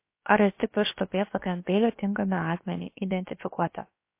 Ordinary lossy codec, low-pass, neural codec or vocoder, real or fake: MP3, 24 kbps; 3.6 kHz; codec, 16 kHz, about 1 kbps, DyCAST, with the encoder's durations; fake